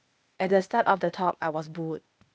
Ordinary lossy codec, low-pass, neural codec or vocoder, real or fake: none; none; codec, 16 kHz, 0.8 kbps, ZipCodec; fake